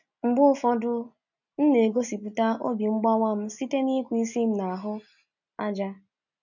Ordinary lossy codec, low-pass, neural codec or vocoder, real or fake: none; 7.2 kHz; none; real